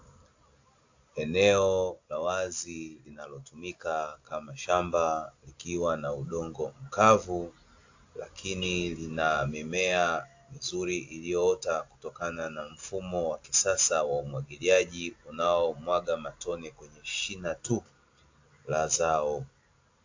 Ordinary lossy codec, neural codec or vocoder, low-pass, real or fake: AAC, 48 kbps; none; 7.2 kHz; real